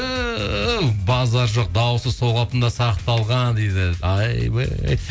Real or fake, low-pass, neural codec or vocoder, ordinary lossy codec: real; none; none; none